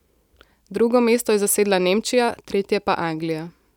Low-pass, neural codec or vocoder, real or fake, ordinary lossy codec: 19.8 kHz; none; real; none